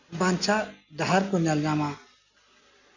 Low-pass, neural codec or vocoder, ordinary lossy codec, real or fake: 7.2 kHz; none; none; real